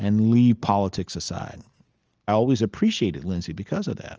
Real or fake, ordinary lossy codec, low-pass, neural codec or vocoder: real; Opus, 24 kbps; 7.2 kHz; none